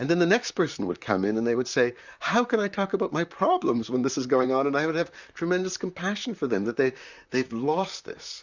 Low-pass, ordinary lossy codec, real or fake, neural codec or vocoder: 7.2 kHz; Opus, 64 kbps; fake; vocoder, 44.1 kHz, 128 mel bands, Pupu-Vocoder